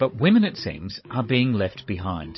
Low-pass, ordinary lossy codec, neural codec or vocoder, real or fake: 7.2 kHz; MP3, 24 kbps; codec, 16 kHz, 16 kbps, FunCodec, trained on Chinese and English, 50 frames a second; fake